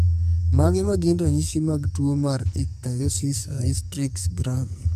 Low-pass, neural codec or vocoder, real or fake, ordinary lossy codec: 14.4 kHz; codec, 44.1 kHz, 2.6 kbps, SNAC; fake; MP3, 96 kbps